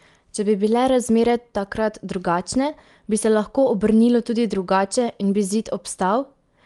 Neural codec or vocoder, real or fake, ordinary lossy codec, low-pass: none; real; Opus, 32 kbps; 10.8 kHz